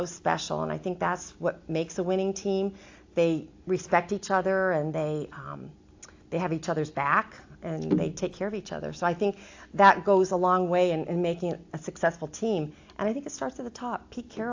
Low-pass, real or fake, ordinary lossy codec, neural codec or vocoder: 7.2 kHz; real; AAC, 48 kbps; none